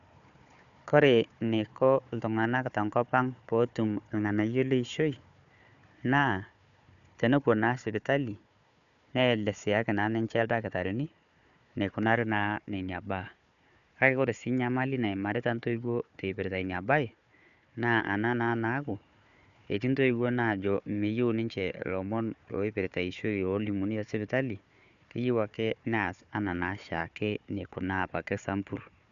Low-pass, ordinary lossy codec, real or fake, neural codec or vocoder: 7.2 kHz; none; fake; codec, 16 kHz, 4 kbps, FunCodec, trained on Chinese and English, 50 frames a second